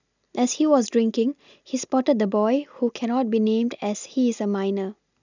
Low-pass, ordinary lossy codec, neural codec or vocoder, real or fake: 7.2 kHz; none; none; real